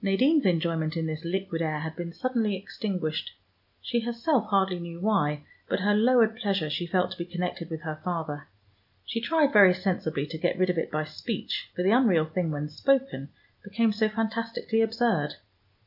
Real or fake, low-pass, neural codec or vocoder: real; 5.4 kHz; none